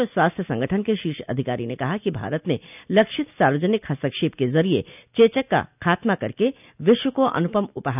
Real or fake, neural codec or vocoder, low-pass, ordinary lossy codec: real; none; 3.6 kHz; none